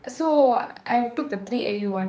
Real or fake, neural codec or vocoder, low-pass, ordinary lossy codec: fake; codec, 16 kHz, 2 kbps, X-Codec, HuBERT features, trained on general audio; none; none